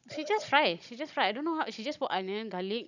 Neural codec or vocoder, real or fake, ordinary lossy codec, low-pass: codec, 16 kHz, 16 kbps, FunCodec, trained on Chinese and English, 50 frames a second; fake; none; 7.2 kHz